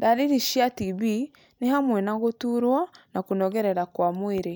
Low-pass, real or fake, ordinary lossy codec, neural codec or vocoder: none; real; none; none